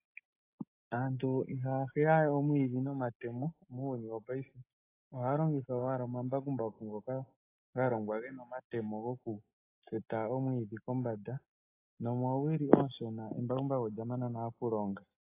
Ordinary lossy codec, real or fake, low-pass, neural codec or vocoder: AAC, 24 kbps; real; 3.6 kHz; none